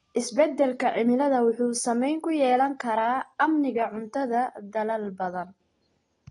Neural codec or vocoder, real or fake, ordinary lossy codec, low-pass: none; real; AAC, 32 kbps; 10.8 kHz